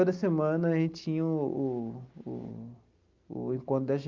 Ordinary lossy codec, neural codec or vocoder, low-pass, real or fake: Opus, 32 kbps; none; 7.2 kHz; real